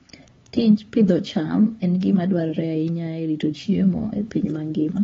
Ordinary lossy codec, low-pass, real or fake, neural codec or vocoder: AAC, 24 kbps; 7.2 kHz; fake; codec, 16 kHz, 4 kbps, X-Codec, HuBERT features, trained on LibriSpeech